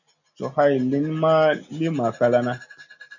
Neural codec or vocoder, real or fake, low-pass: none; real; 7.2 kHz